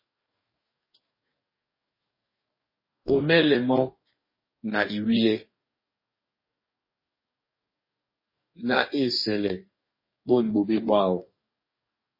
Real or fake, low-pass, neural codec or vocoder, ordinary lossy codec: fake; 5.4 kHz; codec, 44.1 kHz, 2.6 kbps, DAC; MP3, 24 kbps